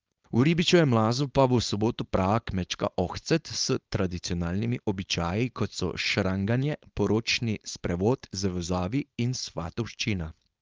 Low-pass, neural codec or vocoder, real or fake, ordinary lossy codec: 7.2 kHz; codec, 16 kHz, 4.8 kbps, FACodec; fake; Opus, 24 kbps